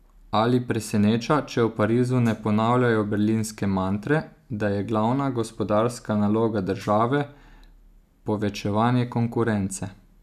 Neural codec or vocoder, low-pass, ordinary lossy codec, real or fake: none; 14.4 kHz; none; real